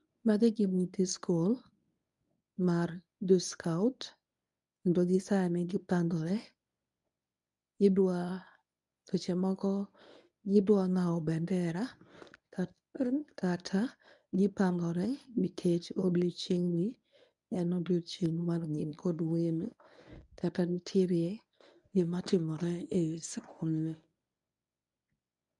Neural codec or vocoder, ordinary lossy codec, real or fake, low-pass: codec, 24 kHz, 0.9 kbps, WavTokenizer, medium speech release version 1; none; fake; 10.8 kHz